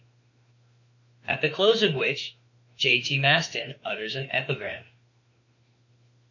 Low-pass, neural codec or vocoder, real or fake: 7.2 kHz; autoencoder, 48 kHz, 32 numbers a frame, DAC-VAE, trained on Japanese speech; fake